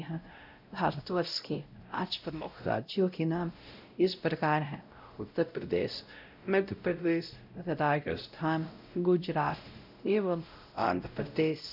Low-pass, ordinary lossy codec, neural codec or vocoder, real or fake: 5.4 kHz; none; codec, 16 kHz, 0.5 kbps, X-Codec, WavLM features, trained on Multilingual LibriSpeech; fake